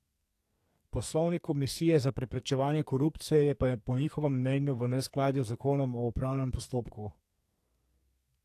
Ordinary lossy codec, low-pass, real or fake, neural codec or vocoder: AAC, 64 kbps; 14.4 kHz; fake; codec, 44.1 kHz, 2.6 kbps, SNAC